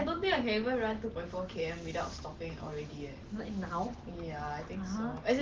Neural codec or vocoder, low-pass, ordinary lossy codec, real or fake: none; 7.2 kHz; Opus, 16 kbps; real